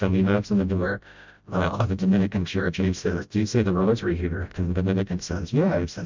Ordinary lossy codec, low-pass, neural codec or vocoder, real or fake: MP3, 64 kbps; 7.2 kHz; codec, 16 kHz, 0.5 kbps, FreqCodec, smaller model; fake